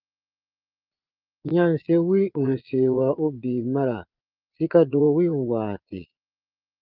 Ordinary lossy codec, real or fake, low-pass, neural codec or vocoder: Opus, 32 kbps; fake; 5.4 kHz; vocoder, 22.05 kHz, 80 mel bands, Vocos